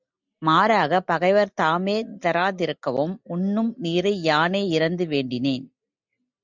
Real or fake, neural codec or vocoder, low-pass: real; none; 7.2 kHz